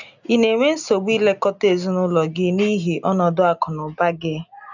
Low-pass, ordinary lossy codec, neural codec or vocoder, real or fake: 7.2 kHz; none; none; real